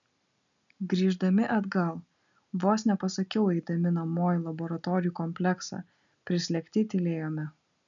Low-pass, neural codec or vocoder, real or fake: 7.2 kHz; none; real